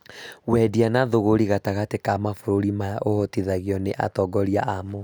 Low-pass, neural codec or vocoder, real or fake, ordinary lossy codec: none; none; real; none